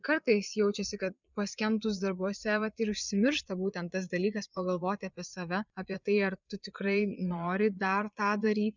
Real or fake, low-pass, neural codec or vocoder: fake; 7.2 kHz; vocoder, 22.05 kHz, 80 mel bands, Vocos